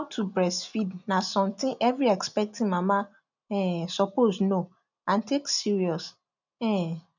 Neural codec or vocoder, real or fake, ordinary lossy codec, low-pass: none; real; none; 7.2 kHz